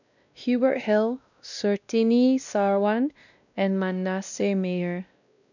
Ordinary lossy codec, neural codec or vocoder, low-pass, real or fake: none; codec, 16 kHz, 1 kbps, X-Codec, WavLM features, trained on Multilingual LibriSpeech; 7.2 kHz; fake